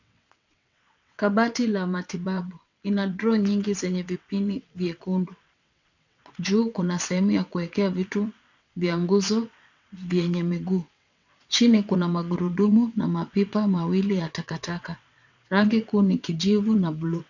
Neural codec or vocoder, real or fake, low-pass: vocoder, 22.05 kHz, 80 mel bands, WaveNeXt; fake; 7.2 kHz